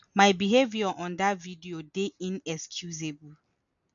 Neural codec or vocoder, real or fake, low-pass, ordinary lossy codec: none; real; 7.2 kHz; none